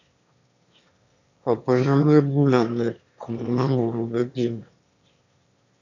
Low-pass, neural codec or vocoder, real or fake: 7.2 kHz; autoencoder, 22.05 kHz, a latent of 192 numbers a frame, VITS, trained on one speaker; fake